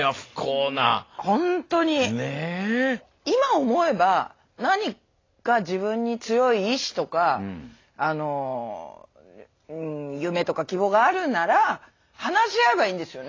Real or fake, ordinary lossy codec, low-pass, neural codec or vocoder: real; AAC, 32 kbps; 7.2 kHz; none